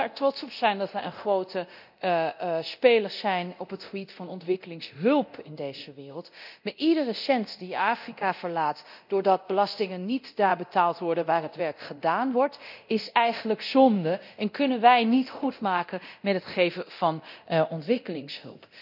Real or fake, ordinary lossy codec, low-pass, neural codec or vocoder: fake; none; 5.4 kHz; codec, 24 kHz, 0.9 kbps, DualCodec